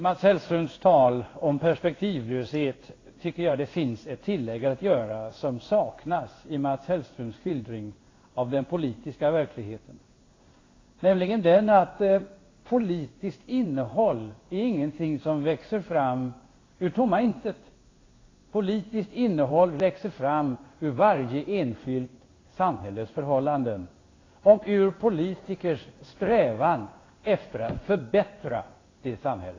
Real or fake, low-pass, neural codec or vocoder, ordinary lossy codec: fake; 7.2 kHz; codec, 16 kHz in and 24 kHz out, 1 kbps, XY-Tokenizer; AAC, 32 kbps